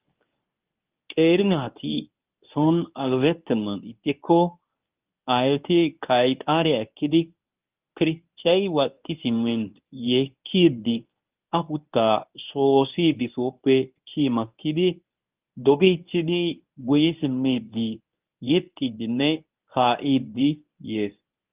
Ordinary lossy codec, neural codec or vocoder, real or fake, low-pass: Opus, 24 kbps; codec, 24 kHz, 0.9 kbps, WavTokenizer, medium speech release version 1; fake; 3.6 kHz